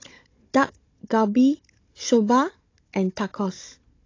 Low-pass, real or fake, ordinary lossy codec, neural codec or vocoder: 7.2 kHz; fake; AAC, 32 kbps; codec, 16 kHz, 16 kbps, FunCodec, trained on Chinese and English, 50 frames a second